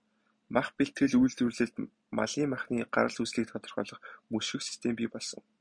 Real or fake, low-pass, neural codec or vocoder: real; 9.9 kHz; none